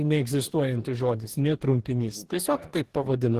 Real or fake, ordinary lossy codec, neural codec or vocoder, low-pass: fake; Opus, 16 kbps; codec, 44.1 kHz, 2.6 kbps, DAC; 14.4 kHz